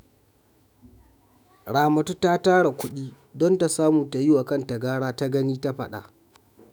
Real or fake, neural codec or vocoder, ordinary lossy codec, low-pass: fake; autoencoder, 48 kHz, 128 numbers a frame, DAC-VAE, trained on Japanese speech; none; none